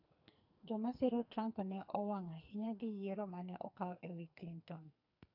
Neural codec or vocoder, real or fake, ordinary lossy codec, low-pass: codec, 44.1 kHz, 2.6 kbps, SNAC; fake; AAC, 32 kbps; 5.4 kHz